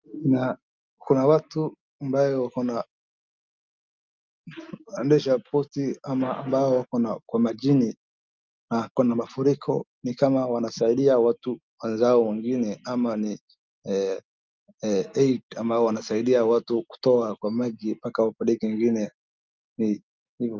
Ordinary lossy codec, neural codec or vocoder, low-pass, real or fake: Opus, 24 kbps; none; 7.2 kHz; real